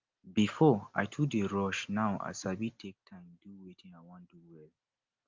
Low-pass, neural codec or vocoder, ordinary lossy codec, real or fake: 7.2 kHz; none; Opus, 32 kbps; real